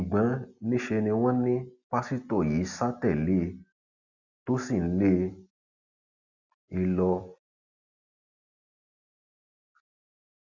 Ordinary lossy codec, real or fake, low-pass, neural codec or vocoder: none; real; 7.2 kHz; none